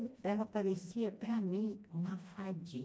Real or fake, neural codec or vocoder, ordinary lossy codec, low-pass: fake; codec, 16 kHz, 1 kbps, FreqCodec, smaller model; none; none